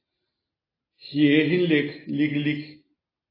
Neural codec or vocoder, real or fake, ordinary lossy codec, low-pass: none; real; AAC, 24 kbps; 5.4 kHz